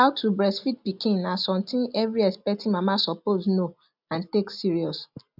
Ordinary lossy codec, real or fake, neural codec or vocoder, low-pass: none; real; none; 5.4 kHz